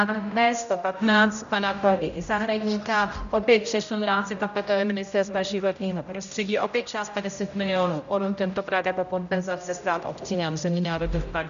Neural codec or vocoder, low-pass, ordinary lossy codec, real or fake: codec, 16 kHz, 0.5 kbps, X-Codec, HuBERT features, trained on general audio; 7.2 kHz; MP3, 96 kbps; fake